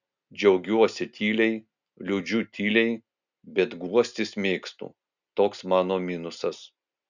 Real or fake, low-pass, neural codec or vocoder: real; 7.2 kHz; none